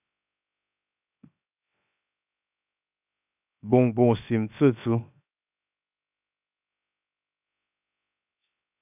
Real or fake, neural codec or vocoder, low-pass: fake; codec, 16 kHz, 0.7 kbps, FocalCodec; 3.6 kHz